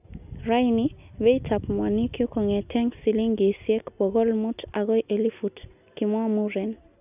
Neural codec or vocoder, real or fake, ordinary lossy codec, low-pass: none; real; none; 3.6 kHz